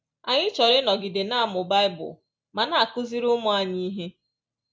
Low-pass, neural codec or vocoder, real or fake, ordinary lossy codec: none; none; real; none